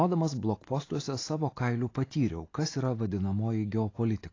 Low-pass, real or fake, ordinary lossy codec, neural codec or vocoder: 7.2 kHz; real; AAC, 32 kbps; none